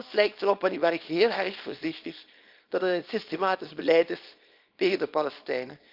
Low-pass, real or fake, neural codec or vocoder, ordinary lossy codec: 5.4 kHz; fake; codec, 24 kHz, 0.9 kbps, WavTokenizer, small release; Opus, 32 kbps